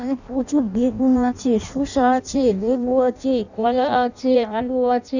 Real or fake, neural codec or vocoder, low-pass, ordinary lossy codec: fake; codec, 16 kHz in and 24 kHz out, 0.6 kbps, FireRedTTS-2 codec; 7.2 kHz; none